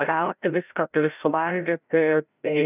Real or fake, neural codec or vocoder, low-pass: fake; codec, 16 kHz, 0.5 kbps, FreqCodec, larger model; 3.6 kHz